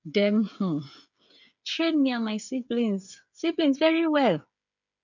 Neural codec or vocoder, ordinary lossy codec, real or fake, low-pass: codec, 16 kHz, 8 kbps, FreqCodec, smaller model; none; fake; 7.2 kHz